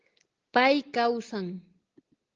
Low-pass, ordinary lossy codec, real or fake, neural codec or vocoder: 7.2 kHz; Opus, 16 kbps; real; none